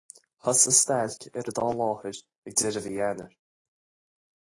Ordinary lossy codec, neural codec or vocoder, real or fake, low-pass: AAC, 32 kbps; none; real; 10.8 kHz